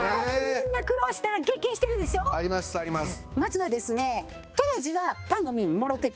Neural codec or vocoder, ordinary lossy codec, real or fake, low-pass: codec, 16 kHz, 2 kbps, X-Codec, HuBERT features, trained on balanced general audio; none; fake; none